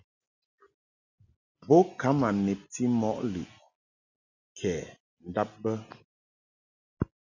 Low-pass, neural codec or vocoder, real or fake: 7.2 kHz; none; real